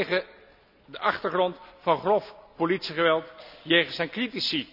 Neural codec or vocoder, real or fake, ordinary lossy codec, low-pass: none; real; none; 5.4 kHz